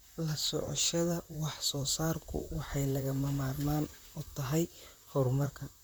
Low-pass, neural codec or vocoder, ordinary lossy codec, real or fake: none; vocoder, 44.1 kHz, 128 mel bands, Pupu-Vocoder; none; fake